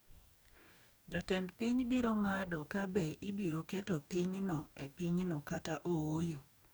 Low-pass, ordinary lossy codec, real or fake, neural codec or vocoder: none; none; fake; codec, 44.1 kHz, 2.6 kbps, DAC